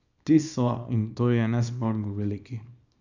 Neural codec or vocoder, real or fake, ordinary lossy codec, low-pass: codec, 24 kHz, 0.9 kbps, WavTokenizer, small release; fake; none; 7.2 kHz